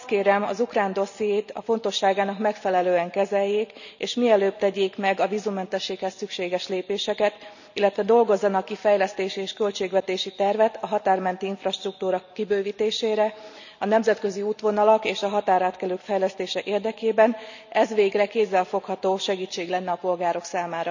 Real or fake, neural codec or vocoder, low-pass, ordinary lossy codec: real; none; 7.2 kHz; none